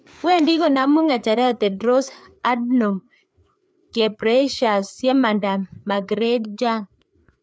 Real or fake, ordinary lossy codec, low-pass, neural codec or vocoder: fake; none; none; codec, 16 kHz, 4 kbps, FreqCodec, larger model